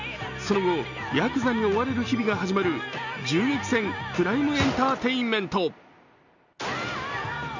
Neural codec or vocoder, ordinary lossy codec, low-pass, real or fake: none; none; 7.2 kHz; real